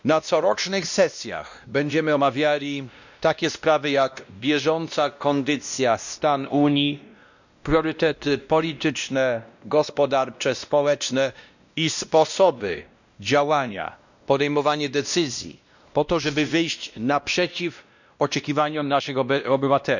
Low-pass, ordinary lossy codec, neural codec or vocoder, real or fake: 7.2 kHz; none; codec, 16 kHz, 1 kbps, X-Codec, WavLM features, trained on Multilingual LibriSpeech; fake